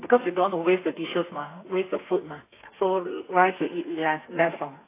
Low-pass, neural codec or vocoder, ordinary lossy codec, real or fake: 3.6 kHz; codec, 32 kHz, 1.9 kbps, SNAC; AAC, 24 kbps; fake